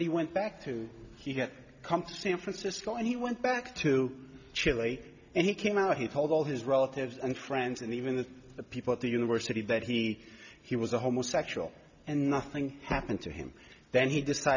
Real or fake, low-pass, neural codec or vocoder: real; 7.2 kHz; none